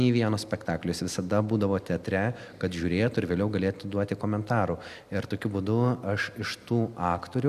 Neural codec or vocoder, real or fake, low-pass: none; real; 14.4 kHz